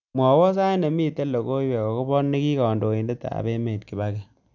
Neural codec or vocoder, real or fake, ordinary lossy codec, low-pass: none; real; none; 7.2 kHz